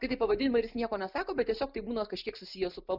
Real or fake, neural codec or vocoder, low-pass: real; none; 5.4 kHz